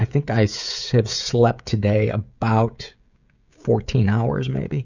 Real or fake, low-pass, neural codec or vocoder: fake; 7.2 kHz; codec, 16 kHz, 16 kbps, FreqCodec, smaller model